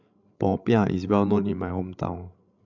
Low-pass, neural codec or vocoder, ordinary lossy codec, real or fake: 7.2 kHz; codec, 16 kHz, 16 kbps, FreqCodec, larger model; none; fake